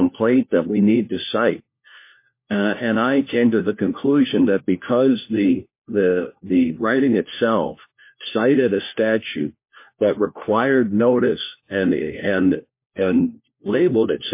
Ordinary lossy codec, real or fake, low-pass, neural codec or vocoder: MP3, 24 kbps; fake; 3.6 kHz; codec, 16 kHz, 1 kbps, FunCodec, trained on LibriTTS, 50 frames a second